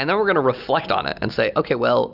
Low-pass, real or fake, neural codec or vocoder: 5.4 kHz; real; none